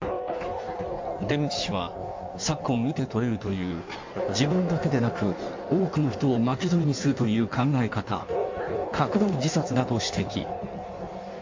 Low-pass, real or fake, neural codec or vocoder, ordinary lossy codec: 7.2 kHz; fake; codec, 16 kHz in and 24 kHz out, 1.1 kbps, FireRedTTS-2 codec; MP3, 48 kbps